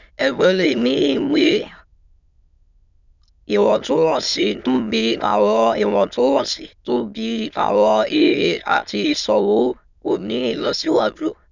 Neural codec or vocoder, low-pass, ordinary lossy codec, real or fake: autoencoder, 22.05 kHz, a latent of 192 numbers a frame, VITS, trained on many speakers; 7.2 kHz; none; fake